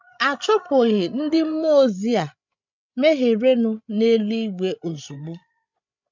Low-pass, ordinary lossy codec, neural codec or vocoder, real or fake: 7.2 kHz; none; codec, 16 kHz, 16 kbps, FreqCodec, larger model; fake